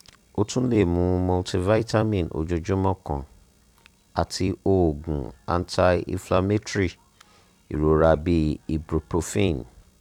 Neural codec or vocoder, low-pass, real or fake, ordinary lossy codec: vocoder, 44.1 kHz, 128 mel bands every 256 samples, BigVGAN v2; 19.8 kHz; fake; none